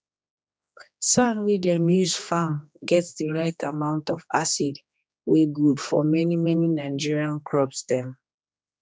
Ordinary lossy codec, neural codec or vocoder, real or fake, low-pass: none; codec, 16 kHz, 2 kbps, X-Codec, HuBERT features, trained on general audio; fake; none